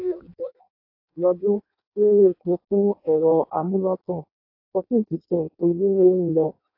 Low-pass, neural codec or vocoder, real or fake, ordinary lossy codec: 5.4 kHz; codec, 16 kHz in and 24 kHz out, 0.6 kbps, FireRedTTS-2 codec; fake; AAC, 32 kbps